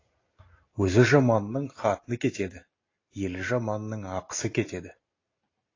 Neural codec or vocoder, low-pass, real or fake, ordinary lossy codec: none; 7.2 kHz; real; AAC, 32 kbps